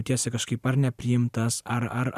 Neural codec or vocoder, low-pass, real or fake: vocoder, 44.1 kHz, 128 mel bands every 512 samples, BigVGAN v2; 14.4 kHz; fake